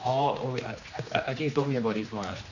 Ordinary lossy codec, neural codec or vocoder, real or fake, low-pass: none; codec, 16 kHz, 2 kbps, X-Codec, HuBERT features, trained on general audio; fake; 7.2 kHz